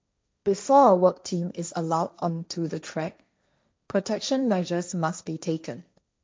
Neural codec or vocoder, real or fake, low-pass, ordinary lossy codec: codec, 16 kHz, 1.1 kbps, Voila-Tokenizer; fake; none; none